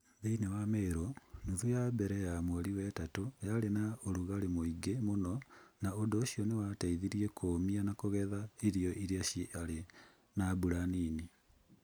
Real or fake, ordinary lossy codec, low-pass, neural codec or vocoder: real; none; none; none